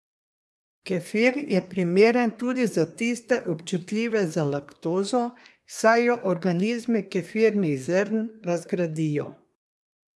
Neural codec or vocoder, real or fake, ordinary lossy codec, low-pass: codec, 24 kHz, 1 kbps, SNAC; fake; none; none